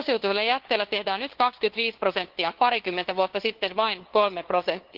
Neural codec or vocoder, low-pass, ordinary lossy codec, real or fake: codec, 16 kHz, 2 kbps, FunCodec, trained on LibriTTS, 25 frames a second; 5.4 kHz; Opus, 16 kbps; fake